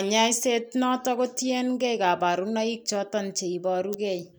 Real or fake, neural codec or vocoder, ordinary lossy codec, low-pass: real; none; none; none